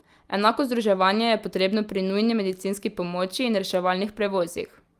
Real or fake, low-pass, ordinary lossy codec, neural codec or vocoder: real; 14.4 kHz; Opus, 32 kbps; none